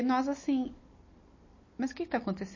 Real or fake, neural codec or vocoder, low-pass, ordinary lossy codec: real; none; 7.2 kHz; MP3, 32 kbps